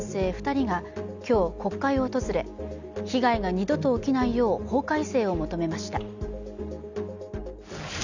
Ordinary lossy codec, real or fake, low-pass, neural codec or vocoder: none; real; 7.2 kHz; none